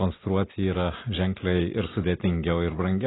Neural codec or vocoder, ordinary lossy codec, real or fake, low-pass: none; AAC, 16 kbps; real; 7.2 kHz